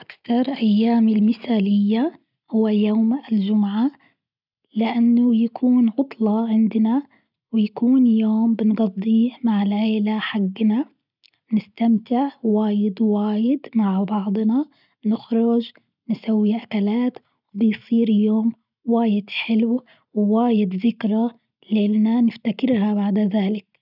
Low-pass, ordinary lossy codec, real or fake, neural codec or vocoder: 5.4 kHz; none; real; none